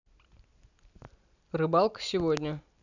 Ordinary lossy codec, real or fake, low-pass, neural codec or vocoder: none; real; 7.2 kHz; none